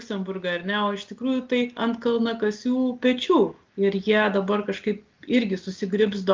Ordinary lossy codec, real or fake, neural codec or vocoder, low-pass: Opus, 32 kbps; real; none; 7.2 kHz